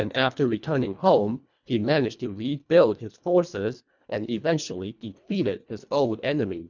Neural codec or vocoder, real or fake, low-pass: codec, 24 kHz, 1.5 kbps, HILCodec; fake; 7.2 kHz